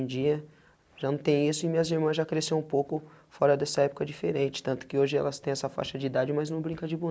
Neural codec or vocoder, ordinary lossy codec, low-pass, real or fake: none; none; none; real